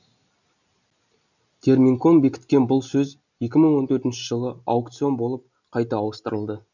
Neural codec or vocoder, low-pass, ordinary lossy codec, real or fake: none; 7.2 kHz; none; real